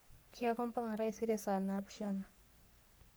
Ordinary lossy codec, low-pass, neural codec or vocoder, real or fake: none; none; codec, 44.1 kHz, 3.4 kbps, Pupu-Codec; fake